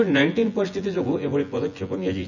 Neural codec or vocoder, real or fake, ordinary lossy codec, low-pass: vocoder, 24 kHz, 100 mel bands, Vocos; fake; none; 7.2 kHz